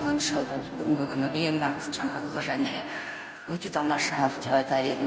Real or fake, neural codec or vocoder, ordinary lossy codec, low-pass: fake; codec, 16 kHz, 0.5 kbps, FunCodec, trained on Chinese and English, 25 frames a second; none; none